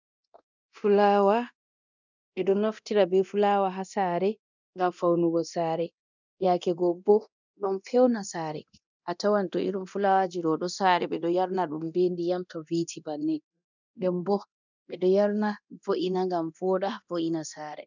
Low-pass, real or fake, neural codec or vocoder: 7.2 kHz; fake; codec, 24 kHz, 0.9 kbps, DualCodec